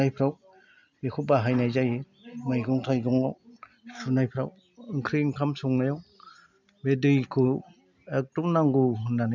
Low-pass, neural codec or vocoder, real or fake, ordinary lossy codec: 7.2 kHz; none; real; none